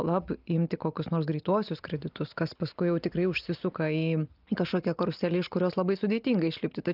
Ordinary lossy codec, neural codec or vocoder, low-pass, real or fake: Opus, 24 kbps; none; 5.4 kHz; real